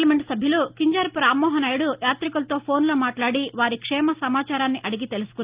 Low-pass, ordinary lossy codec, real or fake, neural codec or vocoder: 3.6 kHz; Opus, 32 kbps; real; none